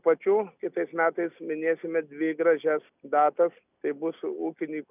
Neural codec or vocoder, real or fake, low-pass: none; real; 3.6 kHz